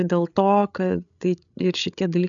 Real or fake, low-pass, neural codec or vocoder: fake; 7.2 kHz; codec, 16 kHz, 8 kbps, FreqCodec, larger model